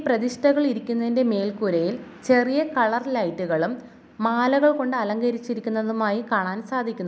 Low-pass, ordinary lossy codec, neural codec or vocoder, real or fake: none; none; none; real